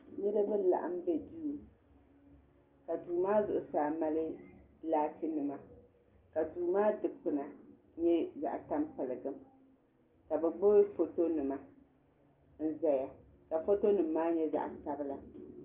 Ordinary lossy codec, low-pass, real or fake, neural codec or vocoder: Opus, 32 kbps; 3.6 kHz; real; none